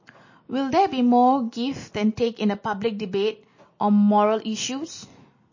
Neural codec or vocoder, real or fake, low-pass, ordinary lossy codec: none; real; 7.2 kHz; MP3, 32 kbps